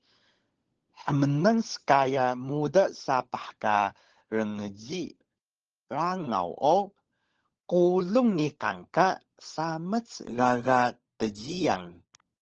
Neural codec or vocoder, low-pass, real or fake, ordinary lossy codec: codec, 16 kHz, 16 kbps, FunCodec, trained on LibriTTS, 50 frames a second; 7.2 kHz; fake; Opus, 16 kbps